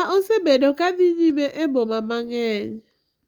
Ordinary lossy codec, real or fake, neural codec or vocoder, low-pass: Opus, 32 kbps; real; none; 19.8 kHz